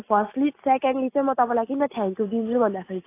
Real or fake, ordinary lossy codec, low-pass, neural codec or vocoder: real; AAC, 24 kbps; 3.6 kHz; none